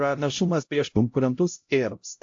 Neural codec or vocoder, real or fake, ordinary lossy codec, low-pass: codec, 16 kHz, 0.5 kbps, X-Codec, HuBERT features, trained on LibriSpeech; fake; AAC, 48 kbps; 7.2 kHz